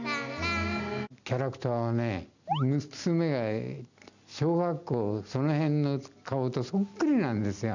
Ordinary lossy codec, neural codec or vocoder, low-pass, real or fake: none; none; 7.2 kHz; real